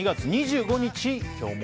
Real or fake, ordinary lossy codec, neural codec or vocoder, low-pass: real; none; none; none